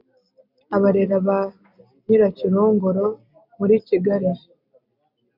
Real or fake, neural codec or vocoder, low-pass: real; none; 5.4 kHz